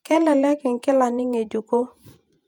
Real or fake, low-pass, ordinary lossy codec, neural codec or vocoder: fake; 19.8 kHz; none; vocoder, 48 kHz, 128 mel bands, Vocos